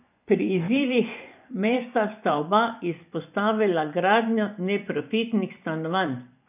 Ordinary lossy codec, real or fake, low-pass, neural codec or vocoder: none; fake; 3.6 kHz; vocoder, 24 kHz, 100 mel bands, Vocos